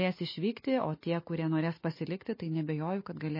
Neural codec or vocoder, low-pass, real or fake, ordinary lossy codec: none; 5.4 kHz; real; MP3, 24 kbps